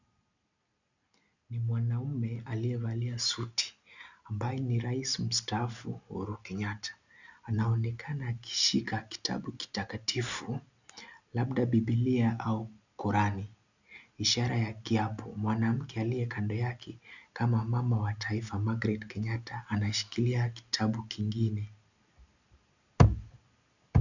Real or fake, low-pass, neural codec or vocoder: real; 7.2 kHz; none